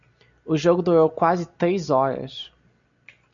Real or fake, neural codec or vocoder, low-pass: real; none; 7.2 kHz